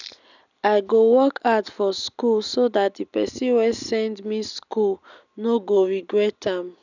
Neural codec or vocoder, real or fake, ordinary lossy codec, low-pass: none; real; none; 7.2 kHz